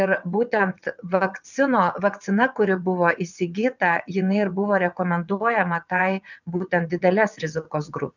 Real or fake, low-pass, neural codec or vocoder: fake; 7.2 kHz; vocoder, 22.05 kHz, 80 mel bands, WaveNeXt